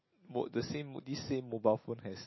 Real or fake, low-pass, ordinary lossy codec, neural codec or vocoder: real; 7.2 kHz; MP3, 24 kbps; none